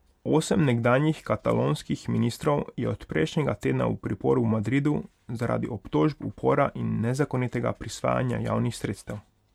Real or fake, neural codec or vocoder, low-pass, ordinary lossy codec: real; none; 19.8 kHz; MP3, 96 kbps